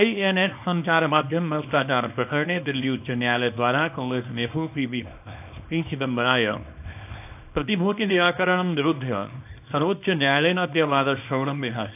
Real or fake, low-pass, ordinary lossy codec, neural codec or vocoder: fake; 3.6 kHz; AAC, 32 kbps; codec, 24 kHz, 0.9 kbps, WavTokenizer, small release